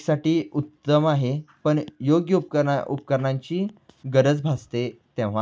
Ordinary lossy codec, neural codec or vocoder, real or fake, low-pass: none; none; real; none